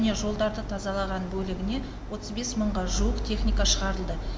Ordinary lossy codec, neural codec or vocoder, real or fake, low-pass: none; none; real; none